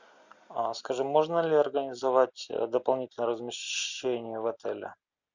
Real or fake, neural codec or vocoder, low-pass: real; none; 7.2 kHz